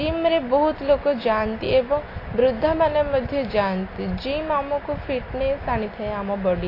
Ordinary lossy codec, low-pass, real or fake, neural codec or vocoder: AAC, 24 kbps; 5.4 kHz; real; none